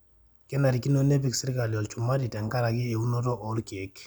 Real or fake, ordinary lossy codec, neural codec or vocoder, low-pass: real; none; none; none